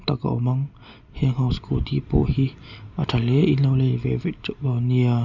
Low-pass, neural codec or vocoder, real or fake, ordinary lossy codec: 7.2 kHz; none; real; none